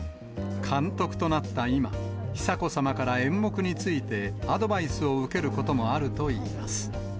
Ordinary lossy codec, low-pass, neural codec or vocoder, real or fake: none; none; none; real